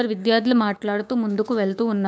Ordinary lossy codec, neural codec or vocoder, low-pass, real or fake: none; codec, 16 kHz, 6 kbps, DAC; none; fake